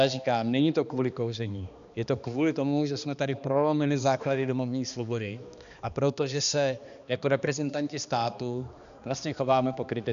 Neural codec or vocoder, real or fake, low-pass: codec, 16 kHz, 2 kbps, X-Codec, HuBERT features, trained on balanced general audio; fake; 7.2 kHz